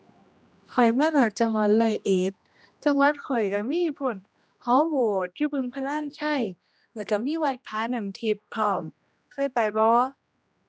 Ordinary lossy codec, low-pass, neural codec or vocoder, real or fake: none; none; codec, 16 kHz, 1 kbps, X-Codec, HuBERT features, trained on general audio; fake